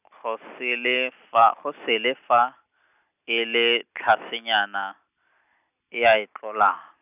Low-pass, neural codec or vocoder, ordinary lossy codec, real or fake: 3.6 kHz; none; none; real